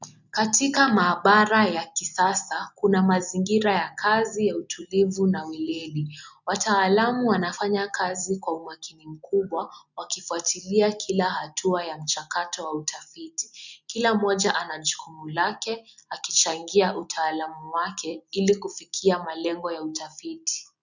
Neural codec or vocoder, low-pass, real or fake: none; 7.2 kHz; real